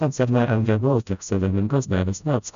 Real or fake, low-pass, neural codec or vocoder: fake; 7.2 kHz; codec, 16 kHz, 0.5 kbps, FreqCodec, smaller model